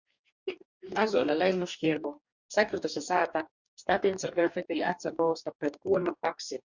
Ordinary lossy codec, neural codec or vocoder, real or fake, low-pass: Opus, 64 kbps; codec, 44.1 kHz, 2.6 kbps, DAC; fake; 7.2 kHz